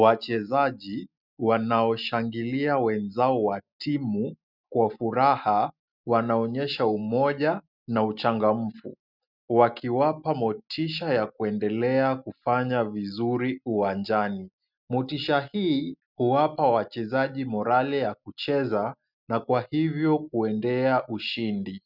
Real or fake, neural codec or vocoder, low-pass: real; none; 5.4 kHz